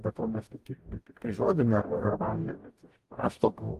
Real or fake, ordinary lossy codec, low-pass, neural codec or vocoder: fake; Opus, 24 kbps; 14.4 kHz; codec, 44.1 kHz, 0.9 kbps, DAC